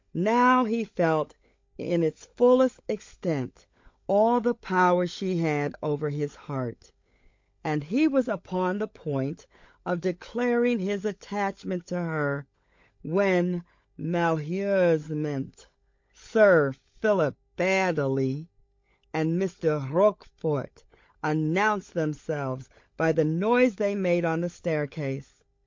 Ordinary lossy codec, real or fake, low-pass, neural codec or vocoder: MP3, 48 kbps; fake; 7.2 kHz; codec, 16 kHz, 16 kbps, FunCodec, trained on LibriTTS, 50 frames a second